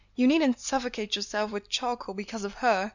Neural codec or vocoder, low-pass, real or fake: none; 7.2 kHz; real